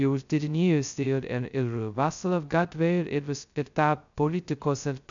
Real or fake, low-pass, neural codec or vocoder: fake; 7.2 kHz; codec, 16 kHz, 0.2 kbps, FocalCodec